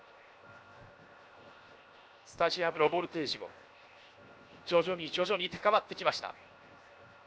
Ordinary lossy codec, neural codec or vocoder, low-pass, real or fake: none; codec, 16 kHz, 0.7 kbps, FocalCodec; none; fake